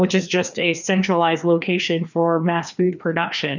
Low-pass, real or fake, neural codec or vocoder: 7.2 kHz; fake; codec, 16 kHz, 2 kbps, FreqCodec, larger model